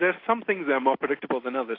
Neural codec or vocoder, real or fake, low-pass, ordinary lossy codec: none; real; 5.4 kHz; AAC, 32 kbps